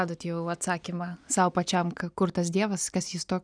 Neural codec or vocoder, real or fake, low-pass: none; real; 9.9 kHz